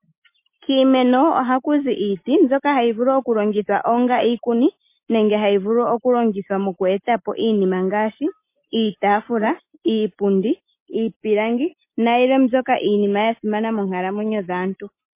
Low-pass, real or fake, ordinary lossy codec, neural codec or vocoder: 3.6 kHz; real; MP3, 24 kbps; none